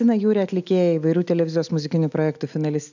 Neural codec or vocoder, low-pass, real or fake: none; 7.2 kHz; real